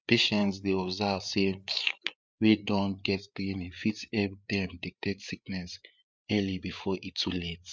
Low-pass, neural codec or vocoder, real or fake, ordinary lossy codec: none; codec, 16 kHz, 8 kbps, FreqCodec, larger model; fake; none